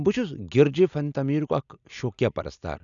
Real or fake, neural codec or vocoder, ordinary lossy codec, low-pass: real; none; none; 7.2 kHz